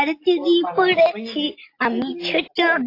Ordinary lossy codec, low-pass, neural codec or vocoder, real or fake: AAC, 32 kbps; 5.4 kHz; none; real